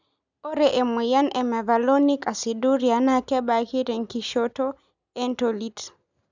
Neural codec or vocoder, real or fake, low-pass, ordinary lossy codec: none; real; 7.2 kHz; none